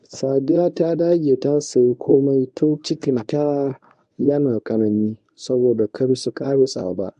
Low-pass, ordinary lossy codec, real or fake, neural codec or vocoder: 10.8 kHz; AAC, 96 kbps; fake; codec, 24 kHz, 0.9 kbps, WavTokenizer, medium speech release version 1